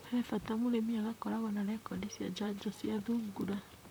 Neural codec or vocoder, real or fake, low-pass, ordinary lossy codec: vocoder, 44.1 kHz, 128 mel bands, Pupu-Vocoder; fake; none; none